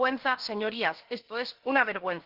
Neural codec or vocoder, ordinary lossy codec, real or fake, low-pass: codec, 16 kHz, about 1 kbps, DyCAST, with the encoder's durations; Opus, 16 kbps; fake; 5.4 kHz